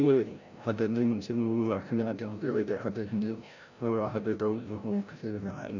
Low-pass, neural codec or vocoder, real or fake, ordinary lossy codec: 7.2 kHz; codec, 16 kHz, 0.5 kbps, FreqCodec, larger model; fake; none